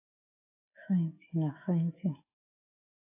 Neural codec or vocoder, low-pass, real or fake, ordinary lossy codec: autoencoder, 48 kHz, 128 numbers a frame, DAC-VAE, trained on Japanese speech; 3.6 kHz; fake; AAC, 24 kbps